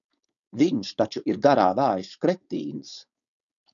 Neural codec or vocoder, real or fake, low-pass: codec, 16 kHz, 4.8 kbps, FACodec; fake; 7.2 kHz